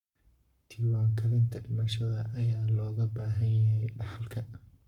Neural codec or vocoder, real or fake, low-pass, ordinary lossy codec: codec, 44.1 kHz, 7.8 kbps, Pupu-Codec; fake; 19.8 kHz; none